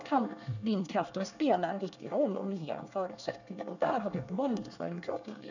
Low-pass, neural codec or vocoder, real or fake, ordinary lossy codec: 7.2 kHz; codec, 24 kHz, 1 kbps, SNAC; fake; none